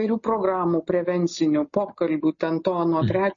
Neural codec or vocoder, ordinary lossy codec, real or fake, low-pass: none; MP3, 32 kbps; real; 7.2 kHz